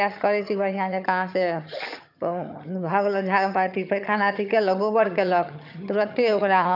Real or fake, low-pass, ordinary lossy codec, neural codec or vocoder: fake; 5.4 kHz; none; vocoder, 22.05 kHz, 80 mel bands, HiFi-GAN